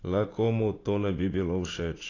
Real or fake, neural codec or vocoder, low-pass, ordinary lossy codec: fake; vocoder, 44.1 kHz, 80 mel bands, Vocos; 7.2 kHz; AAC, 32 kbps